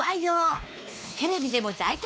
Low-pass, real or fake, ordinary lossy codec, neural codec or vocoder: none; fake; none; codec, 16 kHz, 2 kbps, X-Codec, WavLM features, trained on Multilingual LibriSpeech